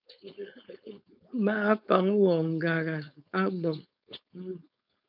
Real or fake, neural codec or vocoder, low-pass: fake; codec, 16 kHz, 4.8 kbps, FACodec; 5.4 kHz